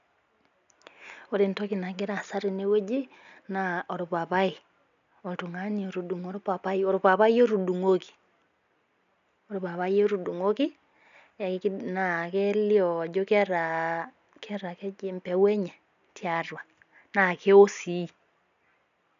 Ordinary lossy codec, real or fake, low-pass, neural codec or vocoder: none; real; 7.2 kHz; none